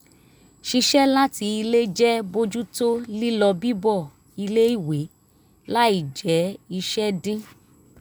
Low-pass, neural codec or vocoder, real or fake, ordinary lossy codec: none; none; real; none